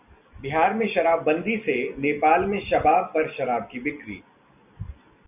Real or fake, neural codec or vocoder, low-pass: real; none; 3.6 kHz